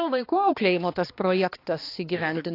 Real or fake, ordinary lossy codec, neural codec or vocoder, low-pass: fake; AAC, 32 kbps; codec, 16 kHz, 2 kbps, X-Codec, HuBERT features, trained on balanced general audio; 5.4 kHz